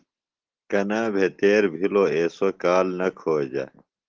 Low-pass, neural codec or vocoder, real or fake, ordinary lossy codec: 7.2 kHz; none; real; Opus, 16 kbps